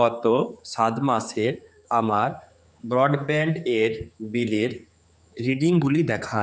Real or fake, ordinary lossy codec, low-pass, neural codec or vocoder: fake; none; none; codec, 16 kHz, 4 kbps, X-Codec, HuBERT features, trained on general audio